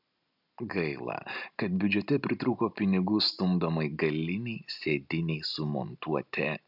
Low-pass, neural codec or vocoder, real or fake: 5.4 kHz; none; real